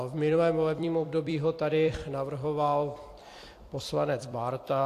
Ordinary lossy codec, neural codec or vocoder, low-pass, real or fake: AAC, 64 kbps; vocoder, 44.1 kHz, 128 mel bands every 256 samples, BigVGAN v2; 14.4 kHz; fake